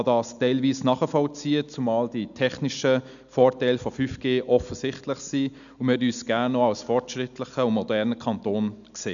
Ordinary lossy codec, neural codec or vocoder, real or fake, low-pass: none; none; real; 7.2 kHz